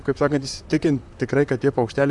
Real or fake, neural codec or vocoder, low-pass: fake; vocoder, 44.1 kHz, 128 mel bands, Pupu-Vocoder; 10.8 kHz